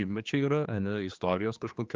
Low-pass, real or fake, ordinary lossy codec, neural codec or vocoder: 7.2 kHz; fake; Opus, 24 kbps; codec, 16 kHz, 2 kbps, X-Codec, HuBERT features, trained on general audio